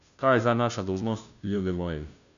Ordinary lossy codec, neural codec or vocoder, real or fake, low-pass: none; codec, 16 kHz, 0.5 kbps, FunCodec, trained on Chinese and English, 25 frames a second; fake; 7.2 kHz